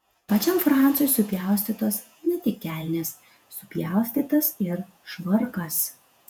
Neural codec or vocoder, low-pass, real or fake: vocoder, 48 kHz, 128 mel bands, Vocos; 19.8 kHz; fake